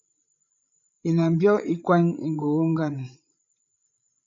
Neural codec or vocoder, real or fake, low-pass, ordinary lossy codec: codec, 16 kHz, 16 kbps, FreqCodec, larger model; fake; 7.2 kHz; AAC, 64 kbps